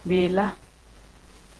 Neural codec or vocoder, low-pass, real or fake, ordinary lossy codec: vocoder, 48 kHz, 128 mel bands, Vocos; 10.8 kHz; fake; Opus, 16 kbps